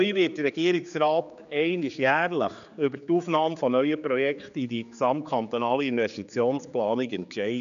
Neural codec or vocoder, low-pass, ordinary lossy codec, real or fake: codec, 16 kHz, 4 kbps, X-Codec, HuBERT features, trained on general audio; 7.2 kHz; none; fake